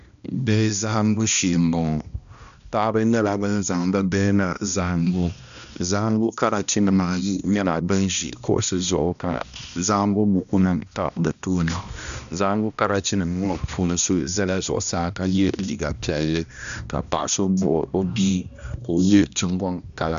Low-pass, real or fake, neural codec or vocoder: 7.2 kHz; fake; codec, 16 kHz, 1 kbps, X-Codec, HuBERT features, trained on balanced general audio